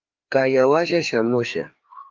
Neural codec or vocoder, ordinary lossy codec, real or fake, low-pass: codec, 16 kHz, 2 kbps, FreqCodec, larger model; Opus, 32 kbps; fake; 7.2 kHz